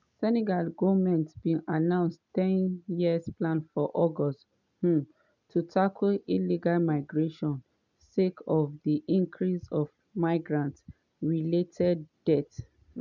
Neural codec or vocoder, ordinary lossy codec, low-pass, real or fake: none; none; 7.2 kHz; real